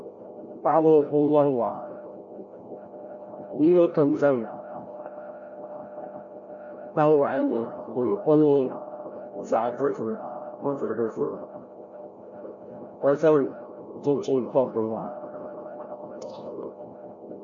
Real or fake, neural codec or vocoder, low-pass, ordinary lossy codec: fake; codec, 16 kHz, 0.5 kbps, FreqCodec, larger model; 7.2 kHz; MP3, 48 kbps